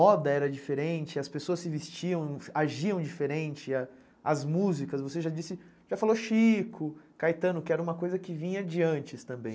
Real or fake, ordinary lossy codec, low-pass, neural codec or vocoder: real; none; none; none